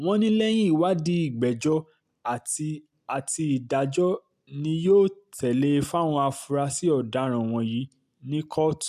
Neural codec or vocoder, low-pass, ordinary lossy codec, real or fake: none; 14.4 kHz; AAC, 96 kbps; real